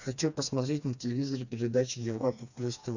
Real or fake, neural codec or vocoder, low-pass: fake; codec, 16 kHz, 2 kbps, FreqCodec, smaller model; 7.2 kHz